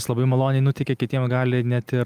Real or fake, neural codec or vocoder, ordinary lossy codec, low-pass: real; none; Opus, 32 kbps; 14.4 kHz